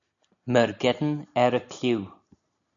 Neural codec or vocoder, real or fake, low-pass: none; real; 7.2 kHz